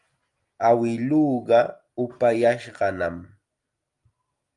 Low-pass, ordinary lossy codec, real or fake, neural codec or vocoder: 10.8 kHz; Opus, 32 kbps; real; none